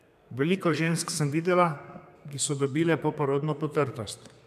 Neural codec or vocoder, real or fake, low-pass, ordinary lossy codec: codec, 32 kHz, 1.9 kbps, SNAC; fake; 14.4 kHz; none